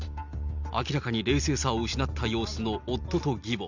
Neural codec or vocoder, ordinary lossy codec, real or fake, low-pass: none; none; real; 7.2 kHz